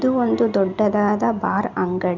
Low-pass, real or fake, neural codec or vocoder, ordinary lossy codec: 7.2 kHz; real; none; none